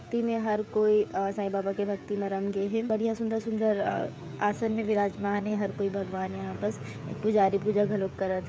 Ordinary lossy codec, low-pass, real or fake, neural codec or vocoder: none; none; fake; codec, 16 kHz, 16 kbps, FreqCodec, smaller model